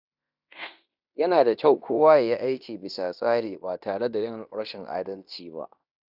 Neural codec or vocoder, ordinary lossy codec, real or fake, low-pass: codec, 16 kHz in and 24 kHz out, 0.9 kbps, LongCat-Audio-Codec, fine tuned four codebook decoder; none; fake; 5.4 kHz